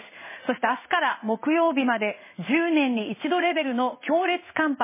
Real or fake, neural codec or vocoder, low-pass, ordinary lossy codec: fake; codec, 16 kHz in and 24 kHz out, 1 kbps, XY-Tokenizer; 3.6 kHz; MP3, 16 kbps